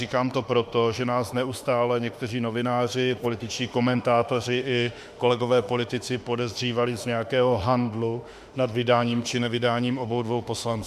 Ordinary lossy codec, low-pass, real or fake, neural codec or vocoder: Opus, 64 kbps; 14.4 kHz; fake; autoencoder, 48 kHz, 32 numbers a frame, DAC-VAE, trained on Japanese speech